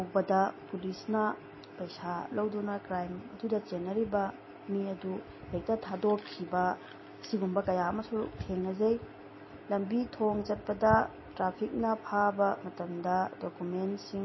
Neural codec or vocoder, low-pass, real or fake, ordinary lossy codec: none; 7.2 kHz; real; MP3, 24 kbps